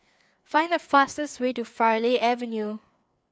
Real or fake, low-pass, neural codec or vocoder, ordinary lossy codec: fake; none; codec, 16 kHz, 4 kbps, FreqCodec, larger model; none